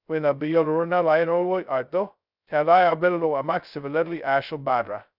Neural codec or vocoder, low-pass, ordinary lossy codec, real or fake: codec, 16 kHz, 0.2 kbps, FocalCodec; 5.4 kHz; none; fake